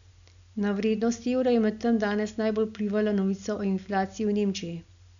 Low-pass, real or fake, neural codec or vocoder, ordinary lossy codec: 7.2 kHz; real; none; none